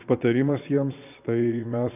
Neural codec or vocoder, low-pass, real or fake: vocoder, 22.05 kHz, 80 mel bands, Vocos; 3.6 kHz; fake